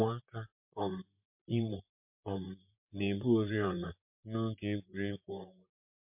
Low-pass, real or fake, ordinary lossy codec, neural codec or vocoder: 3.6 kHz; fake; MP3, 32 kbps; vocoder, 44.1 kHz, 128 mel bands, Pupu-Vocoder